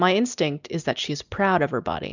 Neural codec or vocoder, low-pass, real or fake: none; 7.2 kHz; real